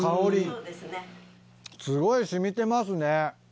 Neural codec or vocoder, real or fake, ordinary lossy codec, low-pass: none; real; none; none